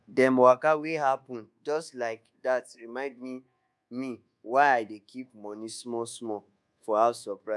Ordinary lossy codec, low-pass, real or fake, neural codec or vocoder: none; none; fake; codec, 24 kHz, 1.2 kbps, DualCodec